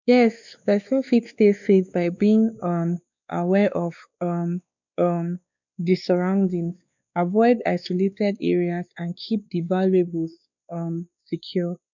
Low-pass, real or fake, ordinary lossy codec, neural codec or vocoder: 7.2 kHz; fake; none; codec, 16 kHz, 2 kbps, X-Codec, WavLM features, trained on Multilingual LibriSpeech